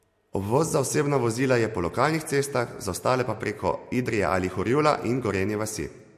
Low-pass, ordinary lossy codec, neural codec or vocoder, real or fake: 14.4 kHz; MP3, 64 kbps; none; real